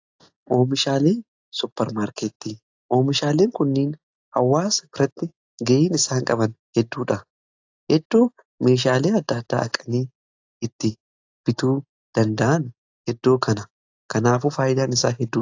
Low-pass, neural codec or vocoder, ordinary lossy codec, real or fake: 7.2 kHz; none; AAC, 48 kbps; real